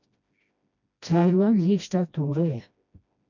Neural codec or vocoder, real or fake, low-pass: codec, 16 kHz, 1 kbps, FreqCodec, smaller model; fake; 7.2 kHz